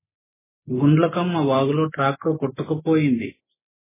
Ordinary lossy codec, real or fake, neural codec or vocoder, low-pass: MP3, 16 kbps; real; none; 3.6 kHz